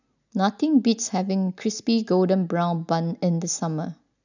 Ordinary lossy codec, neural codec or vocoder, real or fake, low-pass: none; none; real; 7.2 kHz